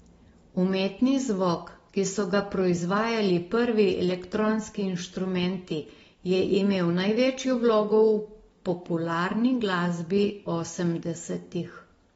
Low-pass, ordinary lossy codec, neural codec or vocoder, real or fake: 19.8 kHz; AAC, 24 kbps; none; real